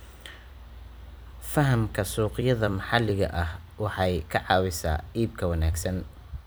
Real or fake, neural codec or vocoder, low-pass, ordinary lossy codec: fake; vocoder, 44.1 kHz, 128 mel bands every 256 samples, BigVGAN v2; none; none